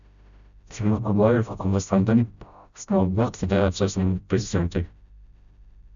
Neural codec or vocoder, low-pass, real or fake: codec, 16 kHz, 0.5 kbps, FreqCodec, smaller model; 7.2 kHz; fake